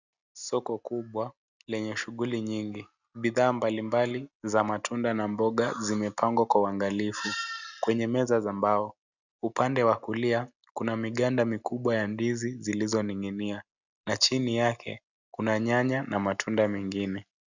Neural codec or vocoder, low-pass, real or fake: none; 7.2 kHz; real